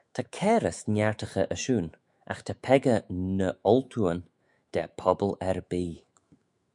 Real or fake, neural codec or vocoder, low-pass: fake; autoencoder, 48 kHz, 128 numbers a frame, DAC-VAE, trained on Japanese speech; 10.8 kHz